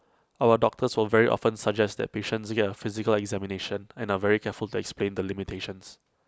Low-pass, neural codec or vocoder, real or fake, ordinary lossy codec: none; none; real; none